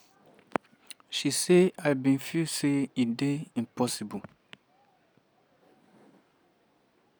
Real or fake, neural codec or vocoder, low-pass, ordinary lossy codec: real; none; none; none